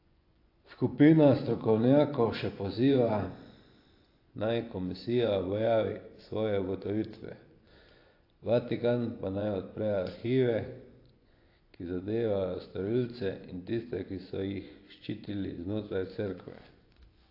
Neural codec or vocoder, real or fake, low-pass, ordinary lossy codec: vocoder, 24 kHz, 100 mel bands, Vocos; fake; 5.4 kHz; AAC, 32 kbps